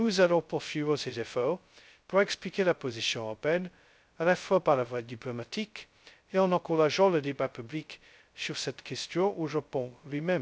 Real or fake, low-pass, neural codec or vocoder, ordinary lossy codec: fake; none; codec, 16 kHz, 0.2 kbps, FocalCodec; none